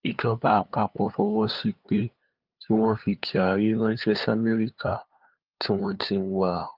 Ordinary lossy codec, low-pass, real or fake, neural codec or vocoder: Opus, 24 kbps; 5.4 kHz; fake; codec, 16 kHz, 2 kbps, FunCodec, trained on LibriTTS, 25 frames a second